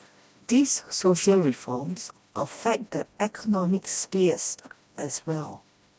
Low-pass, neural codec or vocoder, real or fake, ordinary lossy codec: none; codec, 16 kHz, 1 kbps, FreqCodec, smaller model; fake; none